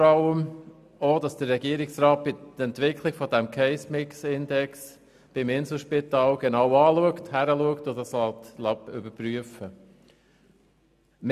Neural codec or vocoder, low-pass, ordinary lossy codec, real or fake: none; 14.4 kHz; none; real